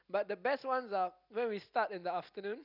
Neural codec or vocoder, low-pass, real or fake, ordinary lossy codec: none; 5.4 kHz; real; none